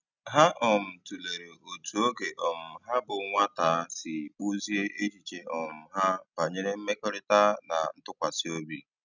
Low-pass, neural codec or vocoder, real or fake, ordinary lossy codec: 7.2 kHz; none; real; none